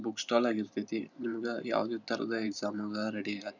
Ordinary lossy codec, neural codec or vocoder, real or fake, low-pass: none; vocoder, 44.1 kHz, 128 mel bands every 512 samples, BigVGAN v2; fake; 7.2 kHz